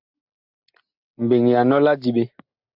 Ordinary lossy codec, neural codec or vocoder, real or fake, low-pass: AAC, 48 kbps; none; real; 5.4 kHz